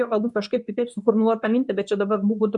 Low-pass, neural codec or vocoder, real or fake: 10.8 kHz; codec, 24 kHz, 0.9 kbps, WavTokenizer, medium speech release version 2; fake